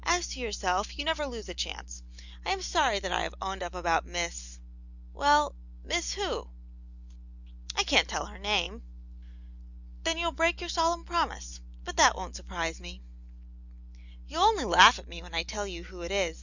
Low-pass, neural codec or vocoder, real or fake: 7.2 kHz; none; real